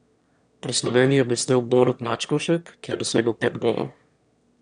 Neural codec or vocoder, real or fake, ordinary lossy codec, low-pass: autoencoder, 22.05 kHz, a latent of 192 numbers a frame, VITS, trained on one speaker; fake; none; 9.9 kHz